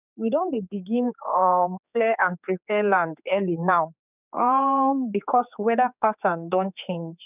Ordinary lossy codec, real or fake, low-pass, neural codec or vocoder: none; fake; 3.6 kHz; codec, 16 kHz, 4 kbps, X-Codec, HuBERT features, trained on general audio